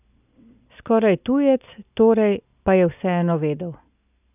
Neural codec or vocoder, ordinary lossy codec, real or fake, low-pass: none; none; real; 3.6 kHz